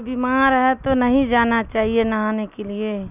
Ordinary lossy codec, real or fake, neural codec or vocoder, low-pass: none; real; none; 3.6 kHz